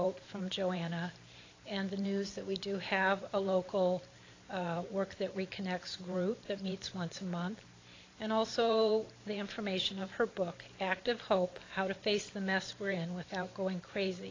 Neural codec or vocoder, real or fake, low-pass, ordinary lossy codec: vocoder, 44.1 kHz, 128 mel bands every 512 samples, BigVGAN v2; fake; 7.2 kHz; AAC, 32 kbps